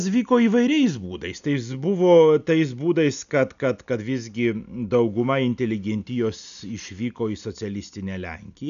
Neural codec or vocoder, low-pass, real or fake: none; 7.2 kHz; real